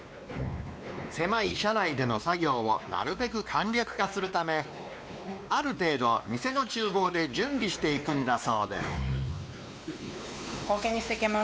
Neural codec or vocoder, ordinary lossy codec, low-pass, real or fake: codec, 16 kHz, 2 kbps, X-Codec, WavLM features, trained on Multilingual LibriSpeech; none; none; fake